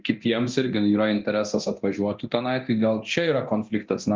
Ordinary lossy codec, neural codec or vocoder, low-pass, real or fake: Opus, 32 kbps; codec, 24 kHz, 0.9 kbps, DualCodec; 7.2 kHz; fake